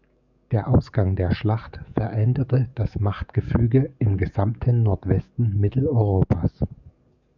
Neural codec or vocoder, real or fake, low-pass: codec, 44.1 kHz, 7.8 kbps, DAC; fake; 7.2 kHz